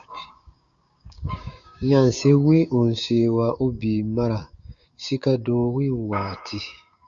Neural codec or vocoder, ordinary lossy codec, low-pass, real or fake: codec, 16 kHz, 6 kbps, DAC; Opus, 64 kbps; 7.2 kHz; fake